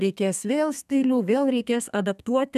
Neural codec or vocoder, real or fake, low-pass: codec, 32 kHz, 1.9 kbps, SNAC; fake; 14.4 kHz